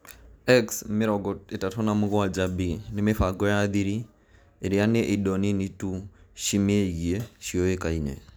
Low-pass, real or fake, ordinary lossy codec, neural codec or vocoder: none; real; none; none